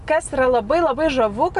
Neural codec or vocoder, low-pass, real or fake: none; 10.8 kHz; real